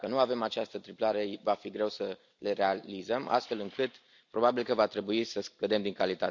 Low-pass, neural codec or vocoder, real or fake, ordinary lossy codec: 7.2 kHz; none; real; none